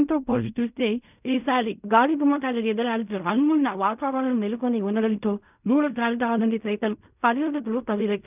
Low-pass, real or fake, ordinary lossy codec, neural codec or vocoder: 3.6 kHz; fake; none; codec, 16 kHz in and 24 kHz out, 0.4 kbps, LongCat-Audio-Codec, fine tuned four codebook decoder